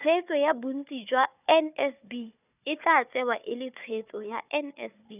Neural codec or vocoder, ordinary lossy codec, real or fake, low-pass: codec, 16 kHz, 4 kbps, FunCodec, trained on Chinese and English, 50 frames a second; none; fake; 3.6 kHz